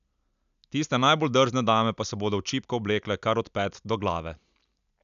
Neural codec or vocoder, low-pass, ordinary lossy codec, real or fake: none; 7.2 kHz; none; real